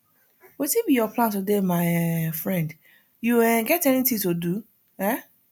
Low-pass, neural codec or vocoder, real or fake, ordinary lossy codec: none; none; real; none